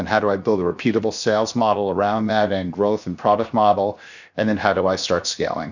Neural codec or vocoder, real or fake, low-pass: codec, 16 kHz, 0.7 kbps, FocalCodec; fake; 7.2 kHz